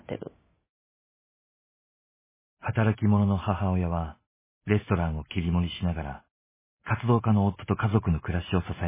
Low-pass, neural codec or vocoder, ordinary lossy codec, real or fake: 3.6 kHz; none; MP3, 16 kbps; real